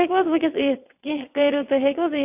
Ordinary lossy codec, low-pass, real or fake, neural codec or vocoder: AAC, 32 kbps; 3.6 kHz; fake; vocoder, 22.05 kHz, 80 mel bands, WaveNeXt